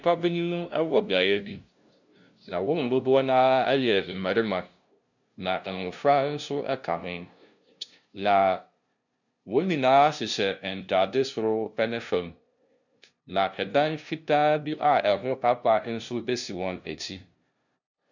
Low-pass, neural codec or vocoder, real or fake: 7.2 kHz; codec, 16 kHz, 0.5 kbps, FunCodec, trained on LibriTTS, 25 frames a second; fake